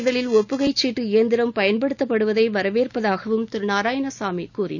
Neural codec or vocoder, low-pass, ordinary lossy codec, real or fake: none; 7.2 kHz; none; real